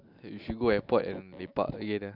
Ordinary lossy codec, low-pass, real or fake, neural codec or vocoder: none; 5.4 kHz; real; none